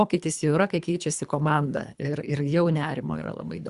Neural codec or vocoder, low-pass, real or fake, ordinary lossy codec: codec, 24 kHz, 3 kbps, HILCodec; 10.8 kHz; fake; Opus, 64 kbps